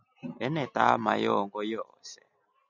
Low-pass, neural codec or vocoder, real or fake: 7.2 kHz; none; real